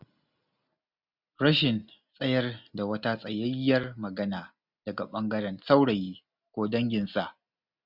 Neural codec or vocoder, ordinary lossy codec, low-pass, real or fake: none; none; 5.4 kHz; real